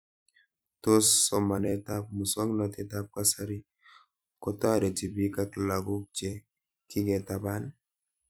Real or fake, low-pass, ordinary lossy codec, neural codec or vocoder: fake; none; none; vocoder, 44.1 kHz, 128 mel bands every 256 samples, BigVGAN v2